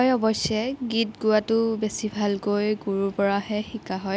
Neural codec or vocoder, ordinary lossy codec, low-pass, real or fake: none; none; none; real